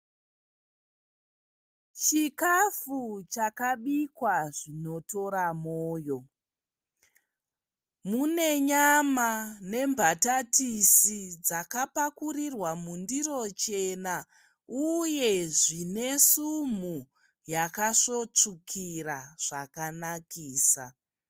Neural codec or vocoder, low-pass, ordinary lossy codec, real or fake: none; 14.4 kHz; Opus, 32 kbps; real